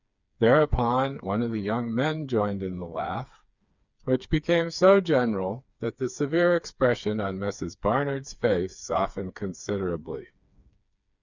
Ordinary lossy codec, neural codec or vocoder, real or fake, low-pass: Opus, 64 kbps; codec, 16 kHz, 4 kbps, FreqCodec, smaller model; fake; 7.2 kHz